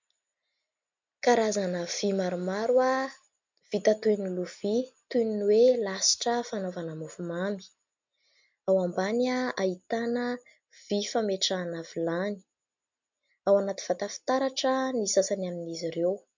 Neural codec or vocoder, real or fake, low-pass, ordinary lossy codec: none; real; 7.2 kHz; MP3, 64 kbps